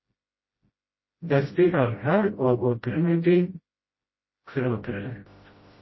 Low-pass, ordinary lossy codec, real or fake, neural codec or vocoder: 7.2 kHz; MP3, 24 kbps; fake; codec, 16 kHz, 0.5 kbps, FreqCodec, smaller model